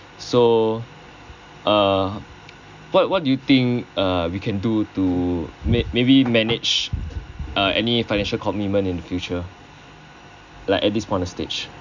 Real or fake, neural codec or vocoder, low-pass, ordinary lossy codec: real; none; 7.2 kHz; none